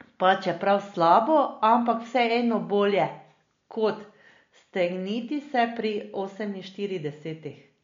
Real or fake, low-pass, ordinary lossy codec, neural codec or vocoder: real; 7.2 kHz; MP3, 48 kbps; none